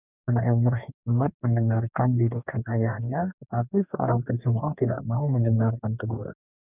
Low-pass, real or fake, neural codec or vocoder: 3.6 kHz; fake; codec, 44.1 kHz, 2.6 kbps, DAC